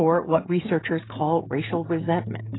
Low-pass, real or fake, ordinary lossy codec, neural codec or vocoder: 7.2 kHz; fake; AAC, 16 kbps; codec, 16 kHz, 16 kbps, FreqCodec, smaller model